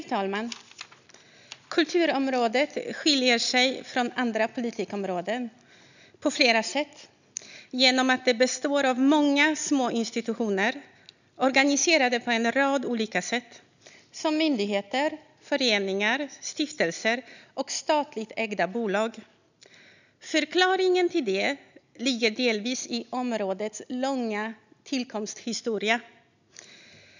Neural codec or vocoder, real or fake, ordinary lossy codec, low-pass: none; real; none; 7.2 kHz